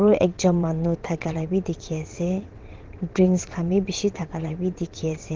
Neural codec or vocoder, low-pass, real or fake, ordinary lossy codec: vocoder, 22.05 kHz, 80 mel bands, WaveNeXt; 7.2 kHz; fake; Opus, 16 kbps